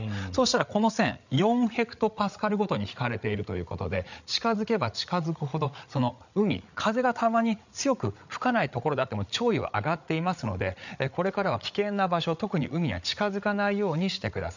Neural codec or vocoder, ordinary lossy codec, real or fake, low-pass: codec, 16 kHz, 8 kbps, FreqCodec, larger model; none; fake; 7.2 kHz